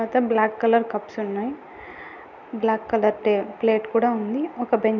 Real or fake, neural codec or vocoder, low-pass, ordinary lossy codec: real; none; 7.2 kHz; none